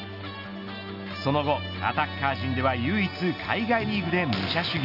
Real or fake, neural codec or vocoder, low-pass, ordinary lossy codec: real; none; 5.4 kHz; none